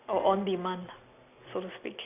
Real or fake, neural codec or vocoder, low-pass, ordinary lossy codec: real; none; 3.6 kHz; none